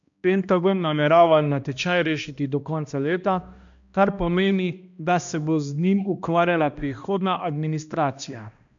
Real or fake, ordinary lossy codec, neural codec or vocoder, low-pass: fake; MP3, 64 kbps; codec, 16 kHz, 1 kbps, X-Codec, HuBERT features, trained on balanced general audio; 7.2 kHz